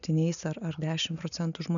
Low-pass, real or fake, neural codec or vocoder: 7.2 kHz; real; none